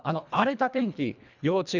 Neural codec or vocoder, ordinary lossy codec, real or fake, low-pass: codec, 24 kHz, 1.5 kbps, HILCodec; none; fake; 7.2 kHz